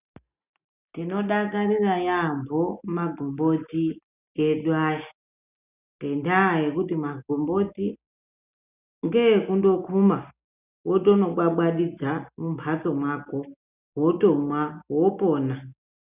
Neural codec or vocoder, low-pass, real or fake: none; 3.6 kHz; real